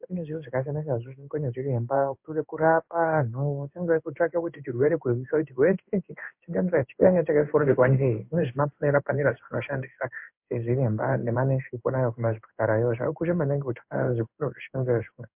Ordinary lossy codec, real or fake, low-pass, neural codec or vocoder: MP3, 32 kbps; fake; 3.6 kHz; codec, 16 kHz in and 24 kHz out, 1 kbps, XY-Tokenizer